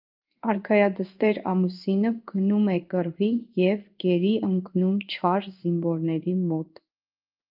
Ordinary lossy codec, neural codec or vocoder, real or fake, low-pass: Opus, 32 kbps; codec, 16 kHz in and 24 kHz out, 1 kbps, XY-Tokenizer; fake; 5.4 kHz